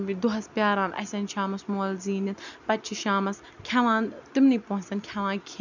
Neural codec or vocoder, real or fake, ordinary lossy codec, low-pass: none; real; none; 7.2 kHz